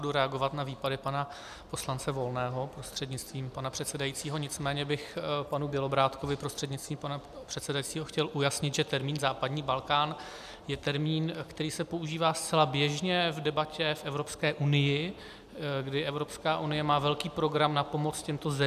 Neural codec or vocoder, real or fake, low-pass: none; real; 14.4 kHz